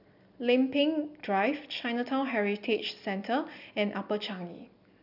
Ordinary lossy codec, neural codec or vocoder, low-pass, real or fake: none; none; 5.4 kHz; real